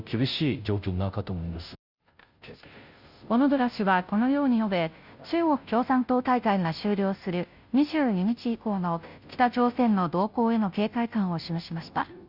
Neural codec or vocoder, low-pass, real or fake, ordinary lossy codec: codec, 16 kHz, 0.5 kbps, FunCodec, trained on Chinese and English, 25 frames a second; 5.4 kHz; fake; Opus, 64 kbps